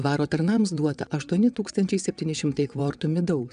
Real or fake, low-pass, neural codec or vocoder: fake; 9.9 kHz; vocoder, 22.05 kHz, 80 mel bands, WaveNeXt